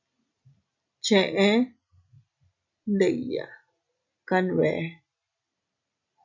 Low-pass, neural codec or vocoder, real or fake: 7.2 kHz; none; real